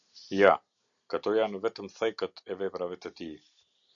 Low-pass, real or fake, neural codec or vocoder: 7.2 kHz; real; none